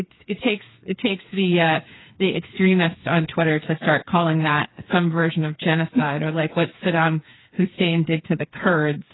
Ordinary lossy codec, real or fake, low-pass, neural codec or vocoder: AAC, 16 kbps; fake; 7.2 kHz; codec, 16 kHz, 4 kbps, FreqCodec, smaller model